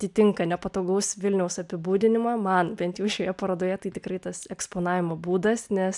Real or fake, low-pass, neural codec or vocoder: real; 10.8 kHz; none